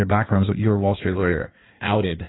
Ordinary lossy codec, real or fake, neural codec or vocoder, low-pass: AAC, 16 kbps; fake; codec, 16 kHz in and 24 kHz out, 1.1 kbps, FireRedTTS-2 codec; 7.2 kHz